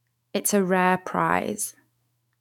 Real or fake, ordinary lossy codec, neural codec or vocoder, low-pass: fake; none; autoencoder, 48 kHz, 128 numbers a frame, DAC-VAE, trained on Japanese speech; 19.8 kHz